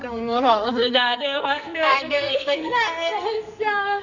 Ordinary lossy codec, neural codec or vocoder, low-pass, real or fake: none; codec, 44.1 kHz, 2.6 kbps, SNAC; 7.2 kHz; fake